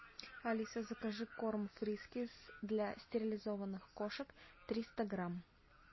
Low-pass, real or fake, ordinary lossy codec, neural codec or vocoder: 7.2 kHz; real; MP3, 24 kbps; none